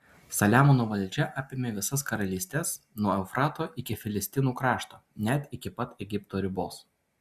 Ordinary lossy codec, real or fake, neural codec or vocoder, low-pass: Opus, 64 kbps; real; none; 14.4 kHz